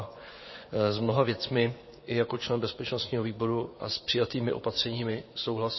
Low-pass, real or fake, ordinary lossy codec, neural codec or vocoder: 7.2 kHz; fake; MP3, 24 kbps; vocoder, 24 kHz, 100 mel bands, Vocos